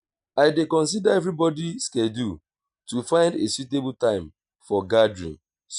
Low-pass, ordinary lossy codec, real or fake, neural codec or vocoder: 9.9 kHz; none; real; none